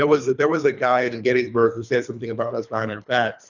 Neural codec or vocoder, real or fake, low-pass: codec, 24 kHz, 3 kbps, HILCodec; fake; 7.2 kHz